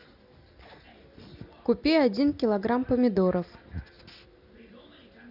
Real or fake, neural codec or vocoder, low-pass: real; none; 5.4 kHz